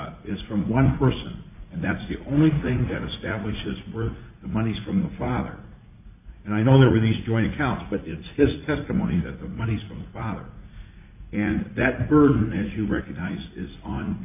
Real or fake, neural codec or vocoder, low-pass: fake; vocoder, 44.1 kHz, 80 mel bands, Vocos; 3.6 kHz